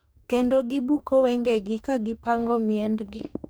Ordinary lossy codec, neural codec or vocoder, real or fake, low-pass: none; codec, 44.1 kHz, 2.6 kbps, DAC; fake; none